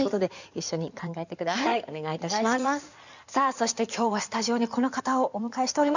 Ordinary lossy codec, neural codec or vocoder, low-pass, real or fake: none; codec, 16 kHz in and 24 kHz out, 2.2 kbps, FireRedTTS-2 codec; 7.2 kHz; fake